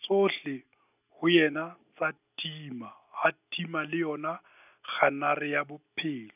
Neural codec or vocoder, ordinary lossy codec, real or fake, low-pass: none; none; real; 3.6 kHz